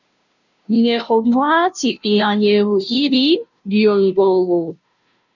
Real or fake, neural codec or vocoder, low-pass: fake; codec, 16 kHz, 0.5 kbps, FunCodec, trained on Chinese and English, 25 frames a second; 7.2 kHz